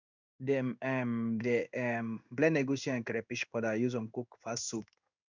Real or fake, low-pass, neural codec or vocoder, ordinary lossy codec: fake; 7.2 kHz; codec, 16 kHz in and 24 kHz out, 1 kbps, XY-Tokenizer; none